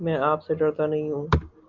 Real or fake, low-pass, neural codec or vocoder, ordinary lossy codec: real; 7.2 kHz; none; MP3, 64 kbps